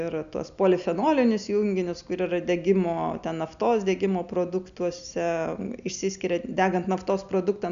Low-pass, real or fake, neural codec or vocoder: 7.2 kHz; real; none